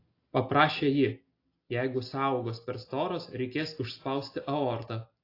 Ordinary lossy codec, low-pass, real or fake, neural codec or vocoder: AAC, 32 kbps; 5.4 kHz; real; none